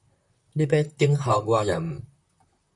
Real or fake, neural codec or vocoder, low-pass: fake; vocoder, 44.1 kHz, 128 mel bands, Pupu-Vocoder; 10.8 kHz